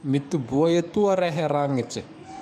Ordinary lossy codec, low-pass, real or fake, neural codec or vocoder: none; 14.4 kHz; fake; codec, 44.1 kHz, 7.8 kbps, DAC